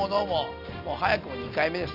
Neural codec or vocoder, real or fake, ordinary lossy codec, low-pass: none; real; none; 5.4 kHz